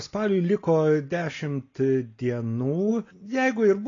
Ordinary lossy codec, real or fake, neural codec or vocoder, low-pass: AAC, 32 kbps; real; none; 7.2 kHz